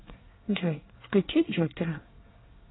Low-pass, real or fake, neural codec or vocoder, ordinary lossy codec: 7.2 kHz; fake; codec, 24 kHz, 1 kbps, SNAC; AAC, 16 kbps